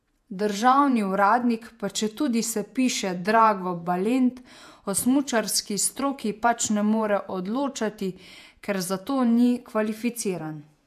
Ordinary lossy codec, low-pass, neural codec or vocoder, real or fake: AAC, 96 kbps; 14.4 kHz; vocoder, 48 kHz, 128 mel bands, Vocos; fake